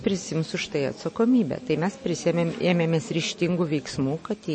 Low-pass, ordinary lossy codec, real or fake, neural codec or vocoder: 9.9 kHz; MP3, 32 kbps; real; none